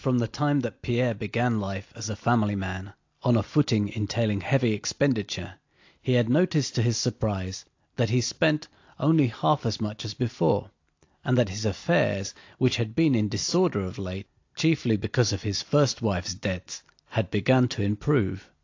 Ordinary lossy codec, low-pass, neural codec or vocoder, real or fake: AAC, 48 kbps; 7.2 kHz; none; real